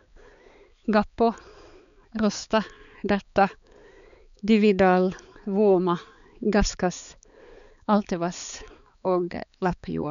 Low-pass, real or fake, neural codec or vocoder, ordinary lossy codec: 7.2 kHz; fake; codec, 16 kHz, 4 kbps, X-Codec, HuBERT features, trained on balanced general audio; none